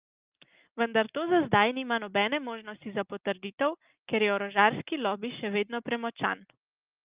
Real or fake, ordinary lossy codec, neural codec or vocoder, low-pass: real; Opus, 32 kbps; none; 3.6 kHz